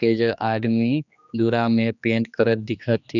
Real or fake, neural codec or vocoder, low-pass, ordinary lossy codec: fake; codec, 16 kHz, 2 kbps, X-Codec, HuBERT features, trained on general audio; 7.2 kHz; none